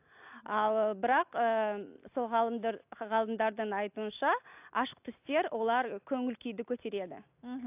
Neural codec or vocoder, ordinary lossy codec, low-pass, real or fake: none; AAC, 32 kbps; 3.6 kHz; real